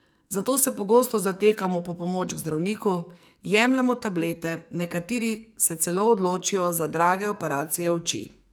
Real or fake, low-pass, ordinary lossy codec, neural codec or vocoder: fake; none; none; codec, 44.1 kHz, 2.6 kbps, SNAC